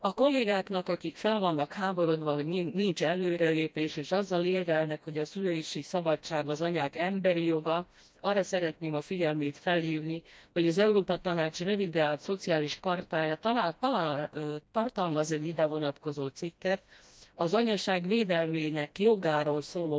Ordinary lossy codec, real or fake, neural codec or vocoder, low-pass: none; fake; codec, 16 kHz, 1 kbps, FreqCodec, smaller model; none